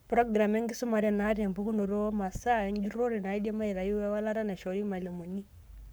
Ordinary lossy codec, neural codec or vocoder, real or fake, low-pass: none; codec, 44.1 kHz, 7.8 kbps, Pupu-Codec; fake; none